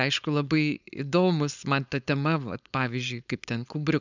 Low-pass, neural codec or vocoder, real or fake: 7.2 kHz; codec, 16 kHz, 4.8 kbps, FACodec; fake